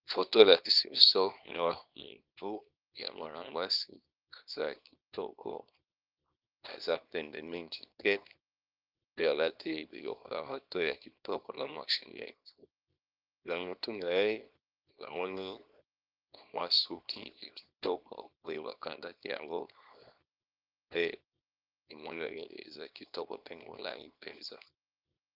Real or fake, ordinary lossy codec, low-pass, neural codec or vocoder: fake; Opus, 24 kbps; 5.4 kHz; codec, 24 kHz, 0.9 kbps, WavTokenizer, small release